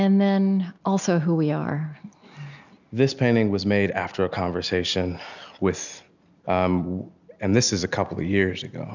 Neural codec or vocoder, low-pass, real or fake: none; 7.2 kHz; real